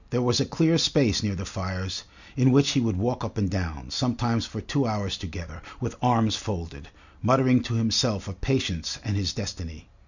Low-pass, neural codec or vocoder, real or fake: 7.2 kHz; none; real